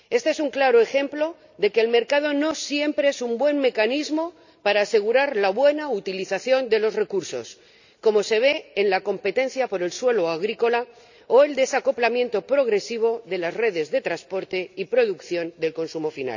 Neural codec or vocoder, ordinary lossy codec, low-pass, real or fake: none; none; 7.2 kHz; real